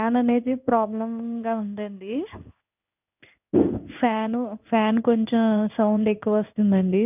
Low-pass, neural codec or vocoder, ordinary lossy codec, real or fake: 3.6 kHz; codec, 16 kHz in and 24 kHz out, 1 kbps, XY-Tokenizer; none; fake